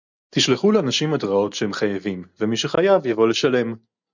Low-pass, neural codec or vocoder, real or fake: 7.2 kHz; none; real